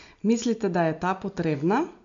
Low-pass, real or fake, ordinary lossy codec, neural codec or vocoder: 7.2 kHz; real; MP3, 48 kbps; none